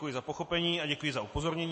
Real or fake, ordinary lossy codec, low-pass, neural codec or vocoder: real; MP3, 32 kbps; 10.8 kHz; none